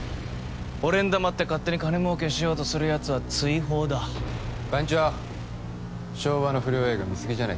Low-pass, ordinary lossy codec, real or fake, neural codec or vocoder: none; none; real; none